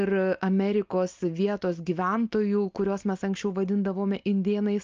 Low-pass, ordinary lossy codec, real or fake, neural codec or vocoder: 7.2 kHz; Opus, 24 kbps; real; none